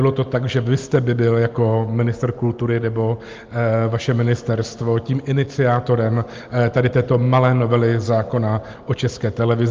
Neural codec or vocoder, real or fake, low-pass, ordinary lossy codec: none; real; 7.2 kHz; Opus, 32 kbps